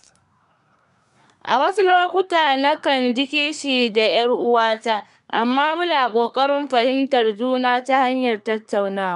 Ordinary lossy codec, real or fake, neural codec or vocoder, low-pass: none; fake; codec, 24 kHz, 1 kbps, SNAC; 10.8 kHz